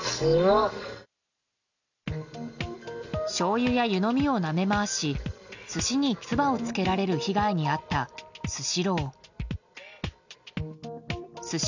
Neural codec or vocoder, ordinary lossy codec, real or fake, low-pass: none; none; real; 7.2 kHz